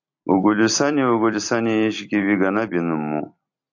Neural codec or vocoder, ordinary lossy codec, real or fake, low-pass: vocoder, 44.1 kHz, 128 mel bands every 256 samples, BigVGAN v2; AAC, 48 kbps; fake; 7.2 kHz